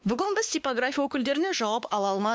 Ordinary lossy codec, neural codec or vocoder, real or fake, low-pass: none; codec, 16 kHz, 2 kbps, X-Codec, WavLM features, trained on Multilingual LibriSpeech; fake; none